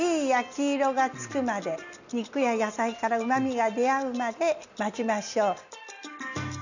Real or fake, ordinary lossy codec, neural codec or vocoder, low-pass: real; none; none; 7.2 kHz